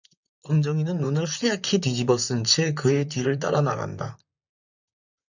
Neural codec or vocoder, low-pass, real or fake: vocoder, 44.1 kHz, 128 mel bands, Pupu-Vocoder; 7.2 kHz; fake